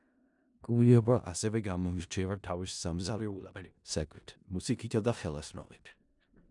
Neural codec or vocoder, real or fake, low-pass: codec, 16 kHz in and 24 kHz out, 0.4 kbps, LongCat-Audio-Codec, four codebook decoder; fake; 10.8 kHz